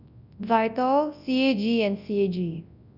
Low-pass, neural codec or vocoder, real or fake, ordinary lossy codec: 5.4 kHz; codec, 24 kHz, 0.9 kbps, WavTokenizer, large speech release; fake; none